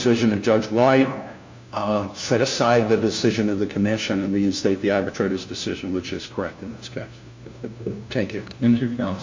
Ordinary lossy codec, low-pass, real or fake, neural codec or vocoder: MP3, 64 kbps; 7.2 kHz; fake; codec, 16 kHz, 1 kbps, FunCodec, trained on LibriTTS, 50 frames a second